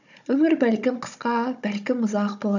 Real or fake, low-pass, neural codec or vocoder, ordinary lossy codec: fake; 7.2 kHz; codec, 16 kHz, 16 kbps, FunCodec, trained on Chinese and English, 50 frames a second; none